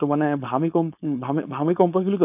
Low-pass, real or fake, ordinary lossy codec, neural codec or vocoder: 3.6 kHz; fake; AAC, 32 kbps; codec, 16 kHz, 4.8 kbps, FACodec